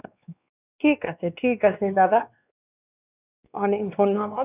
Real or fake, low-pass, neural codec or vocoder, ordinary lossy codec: fake; 3.6 kHz; codec, 16 kHz, 2 kbps, X-Codec, WavLM features, trained on Multilingual LibriSpeech; none